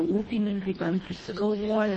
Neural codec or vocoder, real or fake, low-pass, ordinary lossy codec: codec, 24 kHz, 1.5 kbps, HILCodec; fake; 10.8 kHz; MP3, 32 kbps